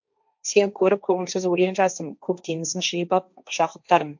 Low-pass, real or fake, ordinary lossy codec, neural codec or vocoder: 7.2 kHz; fake; MP3, 64 kbps; codec, 16 kHz, 1.1 kbps, Voila-Tokenizer